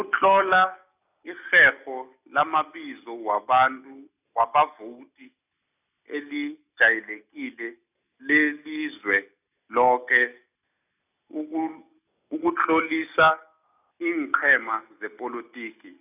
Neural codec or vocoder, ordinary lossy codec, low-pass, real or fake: none; none; 3.6 kHz; real